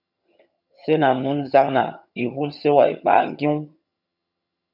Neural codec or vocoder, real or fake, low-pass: vocoder, 22.05 kHz, 80 mel bands, HiFi-GAN; fake; 5.4 kHz